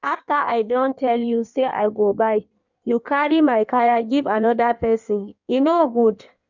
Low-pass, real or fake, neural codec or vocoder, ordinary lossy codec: 7.2 kHz; fake; codec, 16 kHz in and 24 kHz out, 1.1 kbps, FireRedTTS-2 codec; none